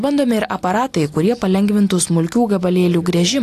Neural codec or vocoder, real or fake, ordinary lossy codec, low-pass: none; real; AAC, 64 kbps; 14.4 kHz